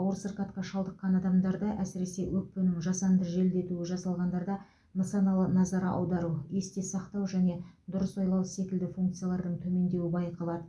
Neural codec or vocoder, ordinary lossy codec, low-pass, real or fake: none; none; none; real